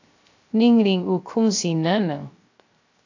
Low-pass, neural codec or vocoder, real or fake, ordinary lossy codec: 7.2 kHz; codec, 16 kHz, 0.3 kbps, FocalCodec; fake; AAC, 48 kbps